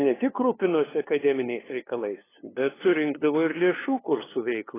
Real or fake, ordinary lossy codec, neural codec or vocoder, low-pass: fake; AAC, 16 kbps; codec, 16 kHz, 2 kbps, FunCodec, trained on LibriTTS, 25 frames a second; 3.6 kHz